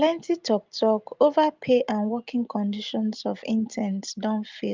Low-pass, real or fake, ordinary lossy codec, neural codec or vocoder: 7.2 kHz; real; Opus, 24 kbps; none